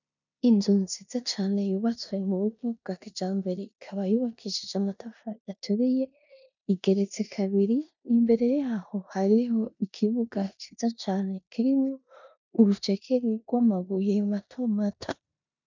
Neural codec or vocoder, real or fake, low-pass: codec, 16 kHz in and 24 kHz out, 0.9 kbps, LongCat-Audio-Codec, four codebook decoder; fake; 7.2 kHz